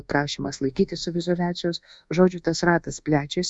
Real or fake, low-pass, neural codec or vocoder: fake; 10.8 kHz; codec, 24 kHz, 1.2 kbps, DualCodec